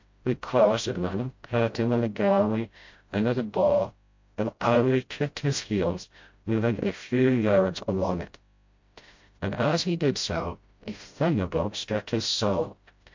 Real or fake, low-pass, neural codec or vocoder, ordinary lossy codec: fake; 7.2 kHz; codec, 16 kHz, 0.5 kbps, FreqCodec, smaller model; MP3, 48 kbps